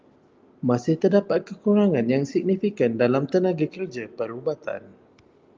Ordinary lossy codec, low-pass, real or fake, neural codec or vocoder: Opus, 24 kbps; 7.2 kHz; real; none